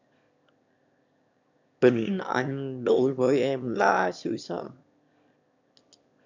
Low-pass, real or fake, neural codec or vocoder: 7.2 kHz; fake; autoencoder, 22.05 kHz, a latent of 192 numbers a frame, VITS, trained on one speaker